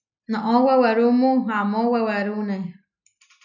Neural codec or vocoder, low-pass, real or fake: none; 7.2 kHz; real